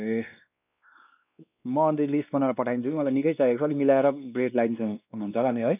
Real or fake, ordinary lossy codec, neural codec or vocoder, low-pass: fake; none; codec, 16 kHz, 2 kbps, X-Codec, WavLM features, trained on Multilingual LibriSpeech; 3.6 kHz